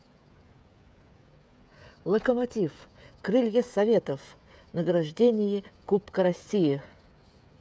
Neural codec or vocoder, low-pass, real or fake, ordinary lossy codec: codec, 16 kHz, 16 kbps, FreqCodec, smaller model; none; fake; none